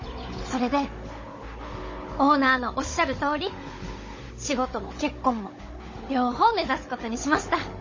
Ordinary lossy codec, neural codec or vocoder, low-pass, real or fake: MP3, 32 kbps; codec, 16 kHz, 16 kbps, FunCodec, trained on Chinese and English, 50 frames a second; 7.2 kHz; fake